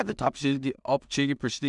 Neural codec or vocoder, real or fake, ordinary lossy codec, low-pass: codec, 16 kHz in and 24 kHz out, 0.4 kbps, LongCat-Audio-Codec, two codebook decoder; fake; none; 10.8 kHz